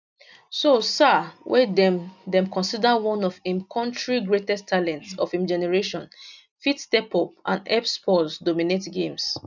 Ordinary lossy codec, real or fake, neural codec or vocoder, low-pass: none; real; none; 7.2 kHz